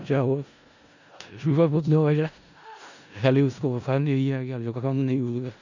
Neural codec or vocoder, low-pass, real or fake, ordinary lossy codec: codec, 16 kHz in and 24 kHz out, 0.4 kbps, LongCat-Audio-Codec, four codebook decoder; 7.2 kHz; fake; none